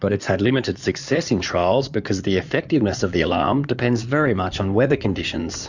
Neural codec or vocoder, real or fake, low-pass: codec, 16 kHz in and 24 kHz out, 2.2 kbps, FireRedTTS-2 codec; fake; 7.2 kHz